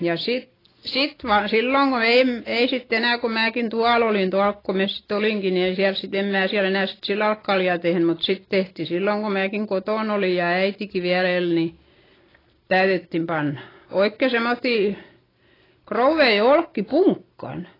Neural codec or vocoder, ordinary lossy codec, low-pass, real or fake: none; AAC, 24 kbps; 5.4 kHz; real